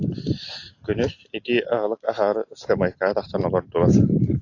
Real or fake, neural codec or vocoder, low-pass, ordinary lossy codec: real; none; 7.2 kHz; AAC, 48 kbps